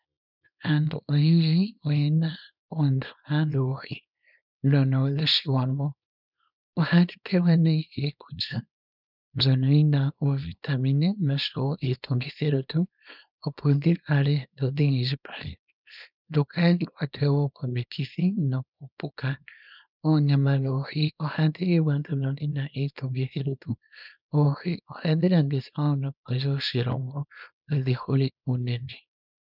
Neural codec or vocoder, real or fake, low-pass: codec, 24 kHz, 0.9 kbps, WavTokenizer, small release; fake; 5.4 kHz